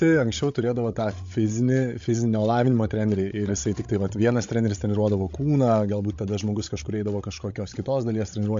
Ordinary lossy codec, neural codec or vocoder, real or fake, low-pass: MP3, 48 kbps; codec, 16 kHz, 16 kbps, FreqCodec, larger model; fake; 7.2 kHz